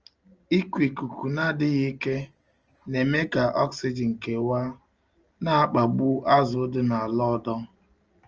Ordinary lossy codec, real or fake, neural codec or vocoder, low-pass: Opus, 24 kbps; real; none; 7.2 kHz